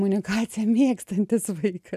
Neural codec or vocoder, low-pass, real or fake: none; 14.4 kHz; real